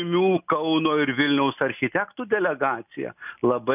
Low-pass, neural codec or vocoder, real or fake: 3.6 kHz; none; real